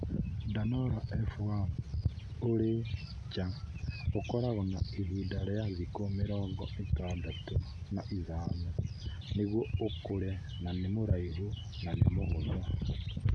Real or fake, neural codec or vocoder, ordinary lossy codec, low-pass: real; none; none; none